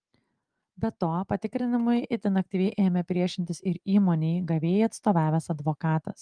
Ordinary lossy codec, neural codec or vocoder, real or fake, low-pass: Opus, 24 kbps; none; real; 9.9 kHz